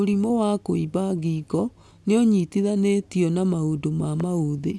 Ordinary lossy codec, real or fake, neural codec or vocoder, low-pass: none; real; none; none